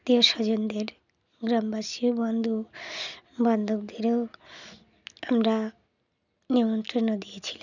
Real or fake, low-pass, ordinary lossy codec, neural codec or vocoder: real; 7.2 kHz; none; none